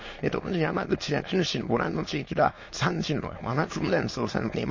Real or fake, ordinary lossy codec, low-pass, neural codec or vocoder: fake; MP3, 32 kbps; 7.2 kHz; autoencoder, 22.05 kHz, a latent of 192 numbers a frame, VITS, trained on many speakers